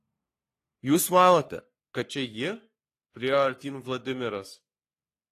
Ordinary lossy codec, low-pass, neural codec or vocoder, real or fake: AAC, 48 kbps; 14.4 kHz; codec, 44.1 kHz, 3.4 kbps, Pupu-Codec; fake